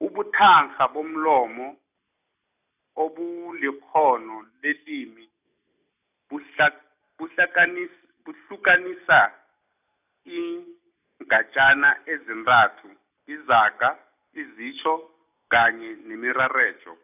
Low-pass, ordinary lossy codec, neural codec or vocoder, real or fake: 3.6 kHz; none; none; real